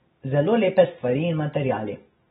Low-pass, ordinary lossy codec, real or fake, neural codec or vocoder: 19.8 kHz; AAC, 16 kbps; fake; vocoder, 44.1 kHz, 128 mel bands every 256 samples, BigVGAN v2